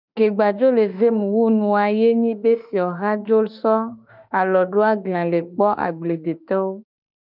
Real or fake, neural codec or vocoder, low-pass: fake; autoencoder, 48 kHz, 32 numbers a frame, DAC-VAE, trained on Japanese speech; 5.4 kHz